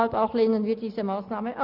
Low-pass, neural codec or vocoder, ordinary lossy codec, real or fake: 5.4 kHz; none; none; real